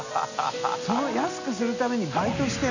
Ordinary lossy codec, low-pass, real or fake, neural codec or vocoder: none; 7.2 kHz; real; none